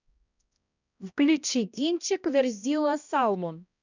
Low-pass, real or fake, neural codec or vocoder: 7.2 kHz; fake; codec, 16 kHz, 1 kbps, X-Codec, HuBERT features, trained on balanced general audio